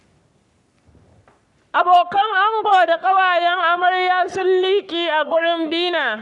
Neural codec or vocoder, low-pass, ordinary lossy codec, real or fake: codec, 44.1 kHz, 3.4 kbps, Pupu-Codec; 10.8 kHz; none; fake